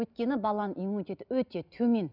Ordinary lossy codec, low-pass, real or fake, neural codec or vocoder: none; 5.4 kHz; real; none